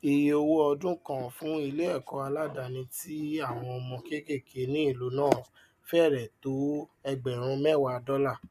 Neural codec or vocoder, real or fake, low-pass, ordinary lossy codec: none; real; 14.4 kHz; none